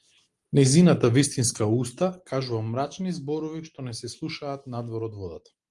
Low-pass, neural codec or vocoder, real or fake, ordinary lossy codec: 10.8 kHz; none; real; Opus, 24 kbps